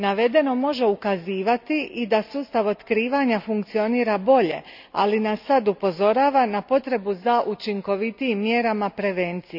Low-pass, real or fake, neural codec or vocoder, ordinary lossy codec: 5.4 kHz; real; none; none